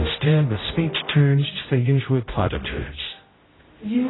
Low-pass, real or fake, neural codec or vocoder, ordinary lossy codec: 7.2 kHz; fake; codec, 16 kHz, 0.5 kbps, X-Codec, HuBERT features, trained on general audio; AAC, 16 kbps